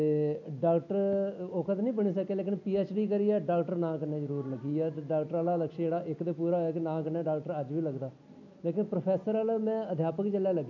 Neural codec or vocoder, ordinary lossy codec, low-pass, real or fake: none; none; 7.2 kHz; real